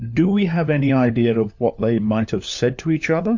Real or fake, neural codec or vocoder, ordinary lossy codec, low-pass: fake; codec, 16 kHz, 4 kbps, FunCodec, trained on LibriTTS, 50 frames a second; MP3, 48 kbps; 7.2 kHz